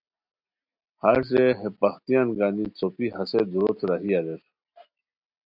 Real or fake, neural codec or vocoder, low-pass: real; none; 5.4 kHz